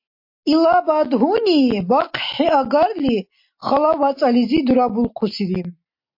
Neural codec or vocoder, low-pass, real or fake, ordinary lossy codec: none; 5.4 kHz; real; MP3, 32 kbps